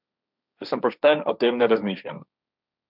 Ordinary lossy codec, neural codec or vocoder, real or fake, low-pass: none; codec, 16 kHz, 1.1 kbps, Voila-Tokenizer; fake; 5.4 kHz